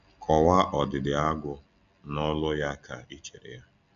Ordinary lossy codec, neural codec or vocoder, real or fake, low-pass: none; none; real; 7.2 kHz